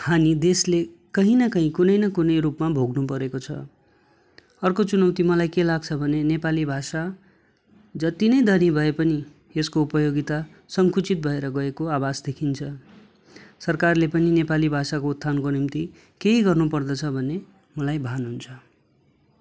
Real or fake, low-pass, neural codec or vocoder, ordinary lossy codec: real; none; none; none